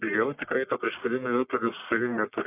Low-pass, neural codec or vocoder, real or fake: 3.6 kHz; codec, 44.1 kHz, 1.7 kbps, Pupu-Codec; fake